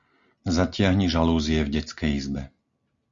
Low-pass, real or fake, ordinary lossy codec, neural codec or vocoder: 7.2 kHz; real; Opus, 64 kbps; none